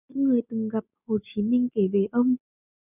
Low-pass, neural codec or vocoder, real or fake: 3.6 kHz; none; real